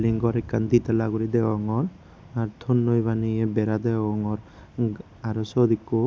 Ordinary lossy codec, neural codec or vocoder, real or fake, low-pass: none; none; real; none